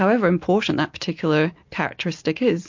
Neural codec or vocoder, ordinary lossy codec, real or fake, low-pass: none; MP3, 48 kbps; real; 7.2 kHz